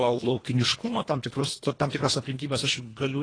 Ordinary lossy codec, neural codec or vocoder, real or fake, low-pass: AAC, 32 kbps; codec, 24 kHz, 1.5 kbps, HILCodec; fake; 9.9 kHz